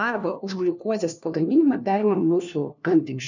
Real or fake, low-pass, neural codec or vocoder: fake; 7.2 kHz; codec, 16 kHz, 1 kbps, FunCodec, trained on LibriTTS, 50 frames a second